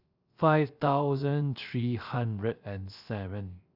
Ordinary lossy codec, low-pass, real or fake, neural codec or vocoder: Opus, 64 kbps; 5.4 kHz; fake; codec, 16 kHz, 0.3 kbps, FocalCodec